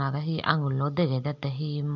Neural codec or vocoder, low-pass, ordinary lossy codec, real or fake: none; 7.2 kHz; none; real